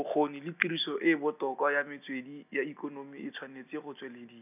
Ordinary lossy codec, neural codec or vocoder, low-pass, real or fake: none; none; 3.6 kHz; real